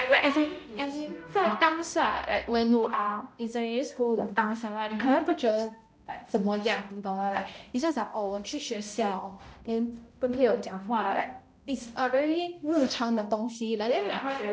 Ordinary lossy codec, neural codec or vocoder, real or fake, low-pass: none; codec, 16 kHz, 0.5 kbps, X-Codec, HuBERT features, trained on balanced general audio; fake; none